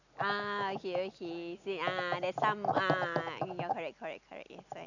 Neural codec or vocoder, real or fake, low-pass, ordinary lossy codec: none; real; 7.2 kHz; none